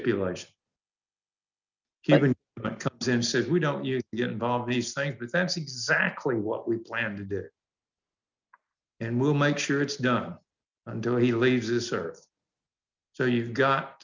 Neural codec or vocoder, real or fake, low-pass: none; real; 7.2 kHz